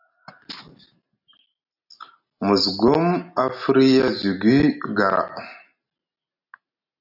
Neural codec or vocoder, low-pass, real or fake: none; 5.4 kHz; real